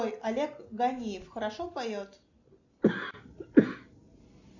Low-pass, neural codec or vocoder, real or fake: 7.2 kHz; none; real